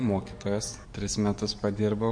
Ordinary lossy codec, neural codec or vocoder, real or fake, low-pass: MP3, 48 kbps; codec, 44.1 kHz, 7.8 kbps, DAC; fake; 9.9 kHz